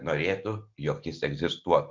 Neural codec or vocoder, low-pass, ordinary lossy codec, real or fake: none; 7.2 kHz; MP3, 64 kbps; real